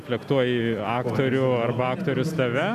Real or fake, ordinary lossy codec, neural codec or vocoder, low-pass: real; MP3, 96 kbps; none; 14.4 kHz